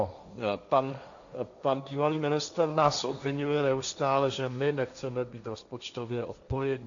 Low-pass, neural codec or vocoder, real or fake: 7.2 kHz; codec, 16 kHz, 1.1 kbps, Voila-Tokenizer; fake